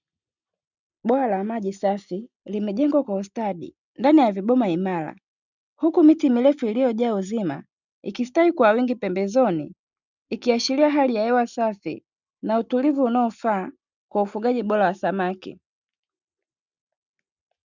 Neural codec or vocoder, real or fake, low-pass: none; real; 7.2 kHz